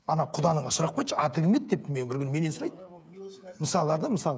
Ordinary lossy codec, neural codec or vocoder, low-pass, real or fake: none; codec, 16 kHz, 8 kbps, FreqCodec, smaller model; none; fake